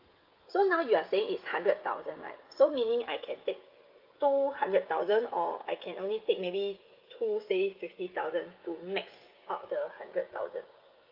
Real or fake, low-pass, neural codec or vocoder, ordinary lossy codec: fake; 5.4 kHz; codec, 16 kHz, 16 kbps, FreqCodec, smaller model; Opus, 32 kbps